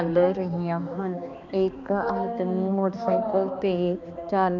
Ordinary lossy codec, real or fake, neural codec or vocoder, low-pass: none; fake; codec, 16 kHz, 2 kbps, X-Codec, HuBERT features, trained on balanced general audio; 7.2 kHz